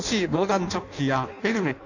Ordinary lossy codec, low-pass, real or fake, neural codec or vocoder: none; 7.2 kHz; fake; codec, 16 kHz in and 24 kHz out, 0.6 kbps, FireRedTTS-2 codec